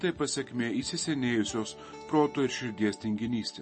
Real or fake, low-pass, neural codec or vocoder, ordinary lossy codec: real; 9.9 kHz; none; MP3, 32 kbps